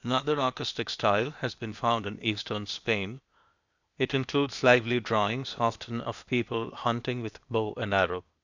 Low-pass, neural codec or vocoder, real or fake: 7.2 kHz; codec, 16 kHz, 0.8 kbps, ZipCodec; fake